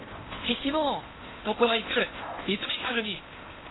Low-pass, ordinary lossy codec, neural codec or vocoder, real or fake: 7.2 kHz; AAC, 16 kbps; codec, 16 kHz in and 24 kHz out, 0.8 kbps, FocalCodec, streaming, 65536 codes; fake